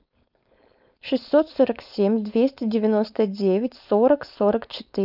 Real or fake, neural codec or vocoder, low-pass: fake; codec, 16 kHz, 4.8 kbps, FACodec; 5.4 kHz